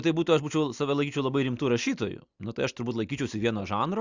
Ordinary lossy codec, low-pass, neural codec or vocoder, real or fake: Opus, 64 kbps; 7.2 kHz; none; real